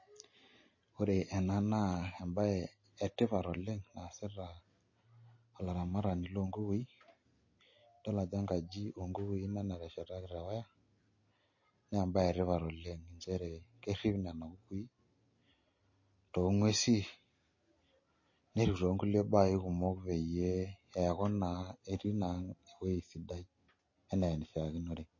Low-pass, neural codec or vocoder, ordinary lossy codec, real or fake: 7.2 kHz; none; MP3, 32 kbps; real